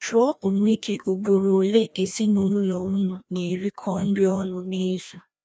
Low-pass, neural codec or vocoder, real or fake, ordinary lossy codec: none; codec, 16 kHz, 1 kbps, FreqCodec, larger model; fake; none